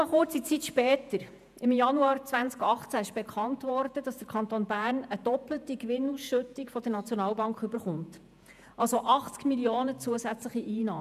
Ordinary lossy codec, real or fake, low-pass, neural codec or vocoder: none; fake; 14.4 kHz; vocoder, 48 kHz, 128 mel bands, Vocos